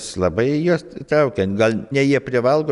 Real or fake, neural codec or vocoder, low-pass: real; none; 10.8 kHz